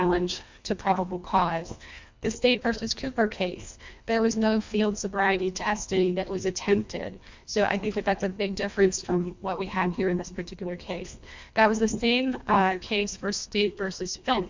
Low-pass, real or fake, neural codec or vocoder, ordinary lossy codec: 7.2 kHz; fake; codec, 24 kHz, 1.5 kbps, HILCodec; MP3, 64 kbps